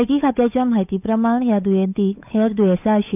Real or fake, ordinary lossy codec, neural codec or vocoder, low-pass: fake; none; codec, 16 kHz, 8 kbps, FunCodec, trained on Chinese and English, 25 frames a second; 3.6 kHz